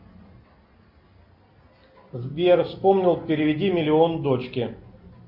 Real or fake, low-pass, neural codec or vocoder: real; 5.4 kHz; none